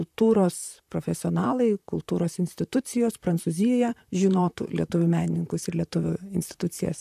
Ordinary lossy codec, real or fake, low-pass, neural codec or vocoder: AAC, 96 kbps; fake; 14.4 kHz; vocoder, 44.1 kHz, 128 mel bands, Pupu-Vocoder